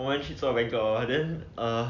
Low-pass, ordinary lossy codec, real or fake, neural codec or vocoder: 7.2 kHz; none; real; none